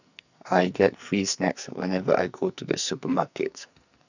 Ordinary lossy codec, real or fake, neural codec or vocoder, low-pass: none; fake; codec, 44.1 kHz, 2.6 kbps, SNAC; 7.2 kHz